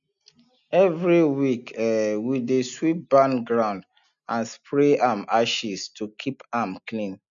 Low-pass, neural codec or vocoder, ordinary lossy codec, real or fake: 7.2 kHz; none; none; real